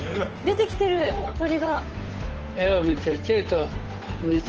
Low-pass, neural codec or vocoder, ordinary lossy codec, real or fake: 7.2 kHz; codec, 16 kHz, 8 kbps, FunCodec, trained on Chinese and English, 25 frames a second; Opus, 16 kbps; fake